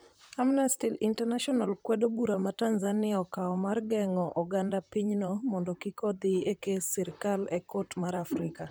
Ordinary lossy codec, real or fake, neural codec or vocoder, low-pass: none; fake; vocoder, 44.1 kHz, 128 mel bands, Pupu-Vocoder; none